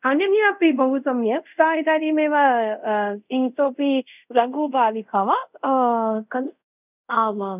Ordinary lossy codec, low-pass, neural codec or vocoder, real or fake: none; 3.6 kHz; codec, 24 kHz, 0.5 kbps, DualCodec; fake